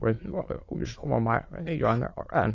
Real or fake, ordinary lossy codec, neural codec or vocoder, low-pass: fake; AAC, 32 kbps; autoencoder, 22.05 kHz, a latent of 192 numbers a frame, VITS, trained on many speakers; 7.2 kHz